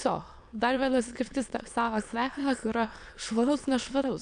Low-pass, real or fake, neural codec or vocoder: 9.9 kHz; fake; autoencoder, 22.05 kHz, a latent of 192 numbers a frame, VITS, trained on many speakers